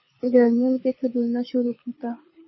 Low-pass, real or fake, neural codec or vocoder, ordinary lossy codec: 7.2 kHz; fake; codec, 24 kHz, 6 kbps, HILCodec; MP3, 24 kbps